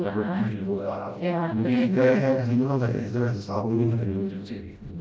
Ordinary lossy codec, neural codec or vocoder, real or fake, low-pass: none; codec, 16 kHz, 0.5 kbps, FreqCodec, smaller model; fake; none